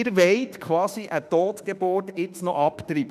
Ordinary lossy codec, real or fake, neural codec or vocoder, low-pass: none; fake; autoencoder, 48 kHz, 32 numbers a frame, DAC-VAE, trained on Japanese speech; 14.4 kHz